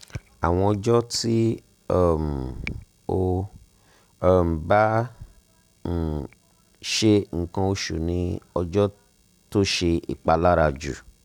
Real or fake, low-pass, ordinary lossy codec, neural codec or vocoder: real; 19.8 kHz; none; none